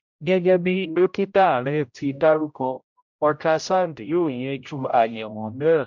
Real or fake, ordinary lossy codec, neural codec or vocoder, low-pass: fake; MP3, 64 kbps; codec, 16 kHz, 0.5 kbps, X-Codec, HuBERT features, trained on general audio; 7.2 kHz